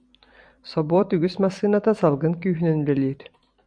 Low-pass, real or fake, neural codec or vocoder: 9.9 kHz; fake; vocoder, 44.1 kHz, 128 mel bands every 256 samples, BigVGAN v2